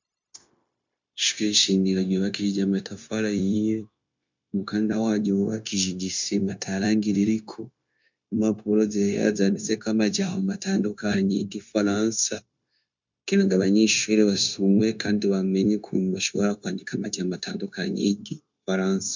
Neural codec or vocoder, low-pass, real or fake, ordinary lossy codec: codec, 16 kHz, 0.9 kbps, LongCat-Audio-Codec; 7.2 kHz; fake; MP3, 64 kbps